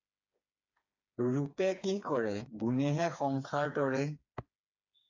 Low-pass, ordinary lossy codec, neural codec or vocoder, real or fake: 7.2 kHz; AAC, 48 kbps; codec, 16 kHz, 4 kbps, FreqCodec, smaller model; fake